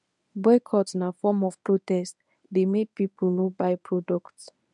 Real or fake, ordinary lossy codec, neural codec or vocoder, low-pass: fake; none; codec, 24 kHz, 0.9 kbps, WavTokenizer, medium speech release version 1; 10.8 kHz